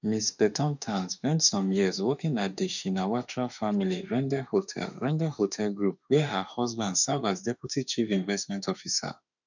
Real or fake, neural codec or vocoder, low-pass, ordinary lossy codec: fake; autoencoder, 48 kHz, 32 numbers a frame, DAC-VAE, trained on Japanese speech; 7.2 kHz; none